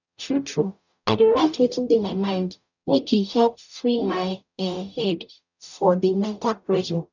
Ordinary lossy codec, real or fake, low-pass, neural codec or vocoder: none; fake; 7.2 kHz; codec, 44.1 kHz, 0.9 kbps, DAC